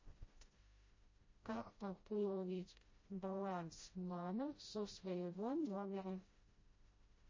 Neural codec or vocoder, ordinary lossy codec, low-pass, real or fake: codec, 16 kHz, 0.5 kbps, FreqCodec, smaller model; MP3, 32 kbps; 7.2 kHz; fake